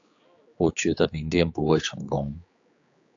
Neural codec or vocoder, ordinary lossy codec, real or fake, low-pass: codec, 16 kHz, 4 kbps, X-Codec, HuBERT features, trained on general audio; AAC, 48 kbps; fake; 7.2 kHz